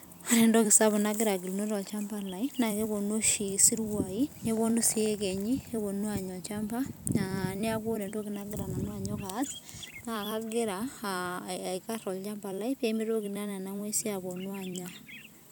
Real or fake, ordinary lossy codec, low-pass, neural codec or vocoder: fake; none; none; vocoder, 44.1 kHz, 128 mel bands every 256 samples, BigVGAN v2